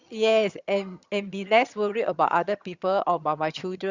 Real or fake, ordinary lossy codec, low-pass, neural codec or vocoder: fake; Opus, 64 kbps; 7.2 kHz; vocoder, 22.05 kHz, 80 mel bands, HiFi-GAN